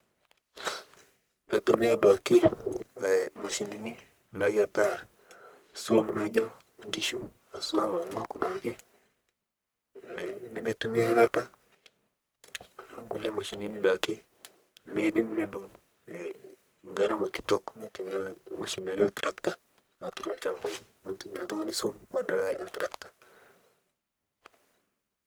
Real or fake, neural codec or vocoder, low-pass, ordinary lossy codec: fake; codec, 44.1 kHz, 1.7 kbps, Pupu-Codec; none; none